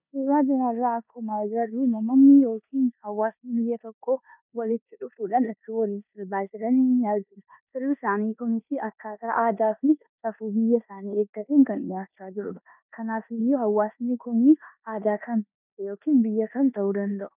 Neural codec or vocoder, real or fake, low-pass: codec, 16 kHz in and 24 kHz out, 0.9 kbps, LongCat-Audio-Codec, four codebook decoder; fake; 3.6 kHz